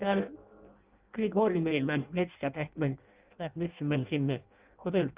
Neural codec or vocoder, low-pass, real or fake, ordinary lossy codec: codec, 16 kHz in and 24 kHz out, 0.6 kbps, FireRedTTS-2 codec; 3.6 kHz; fake; Opus, 24 kbps